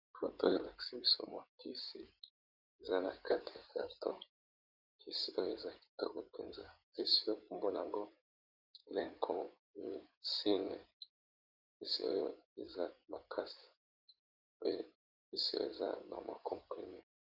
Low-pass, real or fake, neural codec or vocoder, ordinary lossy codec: 5.4 kHz; fake; codec, 16 kHz in and 24 kHz out, 2.2 kbps, FireRedTTS-2 codec; Opus, 64 kbps